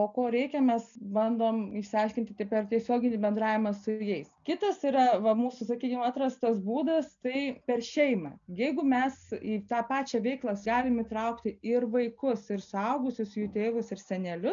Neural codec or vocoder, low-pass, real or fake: none; 7.2 kHz; real